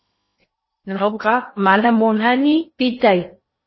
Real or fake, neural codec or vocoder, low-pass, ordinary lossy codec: fake; codec, 16 kHz in and 24 kHz out, 0.8 kbps, FocalCodec, streaming, 65536 codes; 7.2 kHz; MP3, 24 kbps